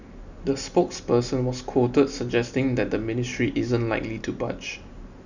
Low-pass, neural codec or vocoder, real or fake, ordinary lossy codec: 7.2 kHz; none; real; none